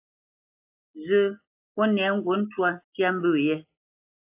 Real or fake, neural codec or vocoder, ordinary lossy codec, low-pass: real; none; AAC, 24 kbps; 3.6 kHz